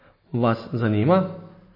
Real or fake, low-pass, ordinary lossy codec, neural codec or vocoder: real; 5.4 kHz; MP3, 24 kbps; none